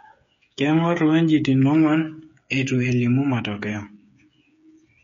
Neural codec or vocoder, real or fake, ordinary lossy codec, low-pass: codec, 16 kHz, 8 kbps, FreqCodec, smaller model; fake; MP3, 48 kbps; 7.2 kHz